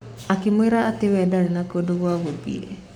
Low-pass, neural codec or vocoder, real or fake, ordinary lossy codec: 19.8 kHz; codec, 44.1 kHz, 7.8 kbps, Pupu-Codec; fake; none